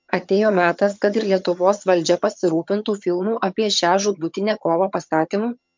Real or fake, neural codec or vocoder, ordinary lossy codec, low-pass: fake; vocoder, 22.05 kHz, 80 mel bands, HiFi-GAN; MP3, 64 kbps; 7.2 kHz